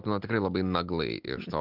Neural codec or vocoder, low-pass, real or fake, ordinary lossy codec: none; 5.4 kHz; real; Opus, 32 kbps